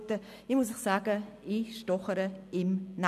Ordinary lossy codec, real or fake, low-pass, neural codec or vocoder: none; real; 14.4 kHz; none